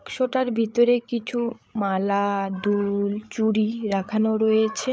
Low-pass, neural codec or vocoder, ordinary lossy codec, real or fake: none; codec, 16 kHz, 8 kbps, FreqCodec, larger model; none; fake